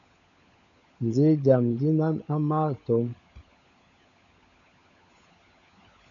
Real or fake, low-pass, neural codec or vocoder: fake; 7.2 kHz; codec, 16 kHz, 16 kbps, FunCodec, trained on LibriTTS, 50 frames a second